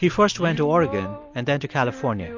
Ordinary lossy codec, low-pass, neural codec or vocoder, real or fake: MP3, 48 kbps; 7.2 kHz; none; real